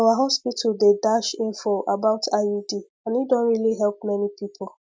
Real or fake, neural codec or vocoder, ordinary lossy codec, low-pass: real; none; none; none